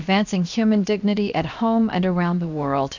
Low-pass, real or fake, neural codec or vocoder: 7.2 kHz; fake; codec, 16 kHz, 0.7 kbps, FocalCodec